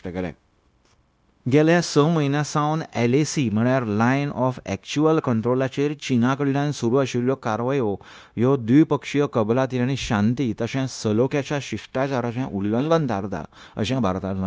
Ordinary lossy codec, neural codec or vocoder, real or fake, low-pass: none; codec, 16 kHz, 0.9 kbps, LongCat-Audio-Codec; fake; none